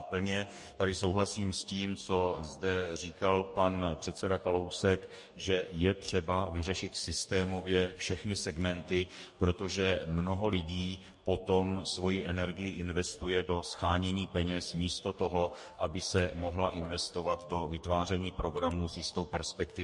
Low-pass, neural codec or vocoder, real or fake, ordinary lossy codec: 10.8 kHz; codec, 44.1 kHz, 2.6 kbps, DAC; fake; MP3, 48 kbps